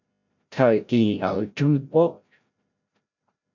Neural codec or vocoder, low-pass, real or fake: codec, 16 kHz, 0.5 kbps, FreqCodec, larger model; 7.2 kHz; fake